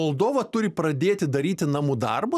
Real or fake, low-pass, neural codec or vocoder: real; 14.4 kHz; none